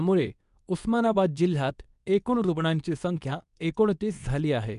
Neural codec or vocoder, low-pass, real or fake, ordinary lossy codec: codec, 24 kHz, 0.9 kbps, WavTokenizer, medium speech release version 2; 10.8 kHz; fake; none